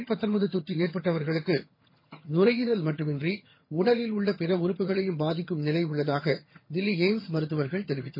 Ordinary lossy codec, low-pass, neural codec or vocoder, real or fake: MP3, 24 kbps; 5.4 kHz; vocoder, 22.05 kHz, 80 mel bands, HiFi-GAN; fake